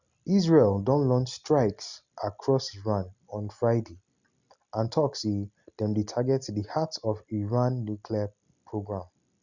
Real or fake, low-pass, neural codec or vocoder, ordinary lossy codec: real; 7.2 kHz; none; Opus, 64 kbps